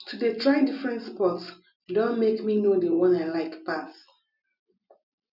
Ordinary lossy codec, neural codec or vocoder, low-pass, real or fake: none; none; 5.4 kHz; real